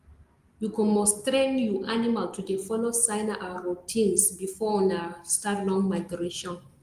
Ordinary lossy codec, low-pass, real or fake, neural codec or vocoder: Opus, 24 kbps; 14.4 kHz; real; none